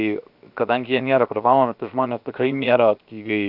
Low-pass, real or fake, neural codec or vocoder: 5.4 kHz; fake; codec, 16 kHz, 0.7 kbps, FocalCodec